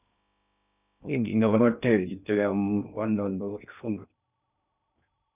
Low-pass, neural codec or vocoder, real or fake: 3.6 kHz; codec, 16 kHz in and 24 kHz out, 0.6 kbps, FocalCodec, streaming, 2048 codes; fake